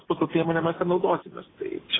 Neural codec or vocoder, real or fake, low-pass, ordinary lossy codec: none; real; 7.2 kHz; AAC, 16 kbps